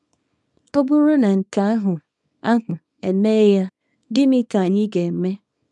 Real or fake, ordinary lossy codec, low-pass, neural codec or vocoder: fake; none; 10.8 kHz; codec, 24 kHz, 0.9 kbps, WavTokenizer, small release